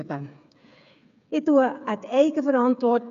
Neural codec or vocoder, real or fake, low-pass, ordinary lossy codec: codec, 16 kHz, 8 kbps, FreqCodec, smaller model; fake; 7.2 kHz; MP3, 64 kbps